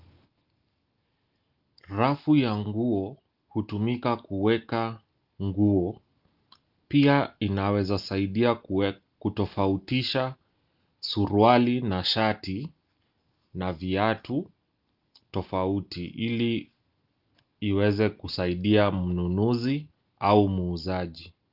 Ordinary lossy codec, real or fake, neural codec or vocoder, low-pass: Opus, 32 kbps; real; none; 5.4 kHz